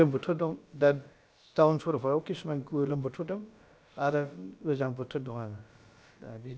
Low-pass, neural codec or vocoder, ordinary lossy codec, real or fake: none; codec, 16 kHz, about 1 kbps, DyCAST, with the encoder's durations; none; fake